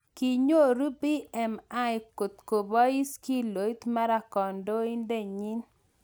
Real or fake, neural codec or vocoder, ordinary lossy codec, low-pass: real; none; none; none